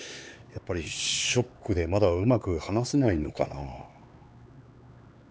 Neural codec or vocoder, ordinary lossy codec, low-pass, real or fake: codec, 16 kHz, 4 kbps, X-Codec, HuBERT features, trained on LibriSpeech; none; none; fake